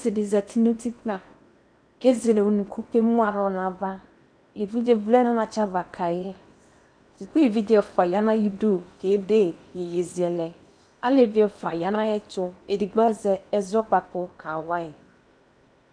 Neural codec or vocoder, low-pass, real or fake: codec, 16 kHz in and 24 kHz out, 0.8 kbps, FocalCodec, streaming, 65536 codes; 9.9 kHz; fake